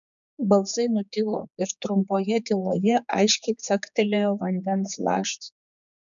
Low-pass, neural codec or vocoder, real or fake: 7.2 kHz; codec, 16 kHz, 4 kbps, X-Codec, HuBERT features, trained on general audio; fake